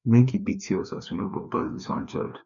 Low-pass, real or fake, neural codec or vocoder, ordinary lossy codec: 7.2 kHz; fake; codec, 16 kHz, 2 kbps, FreqCodec, larger model; none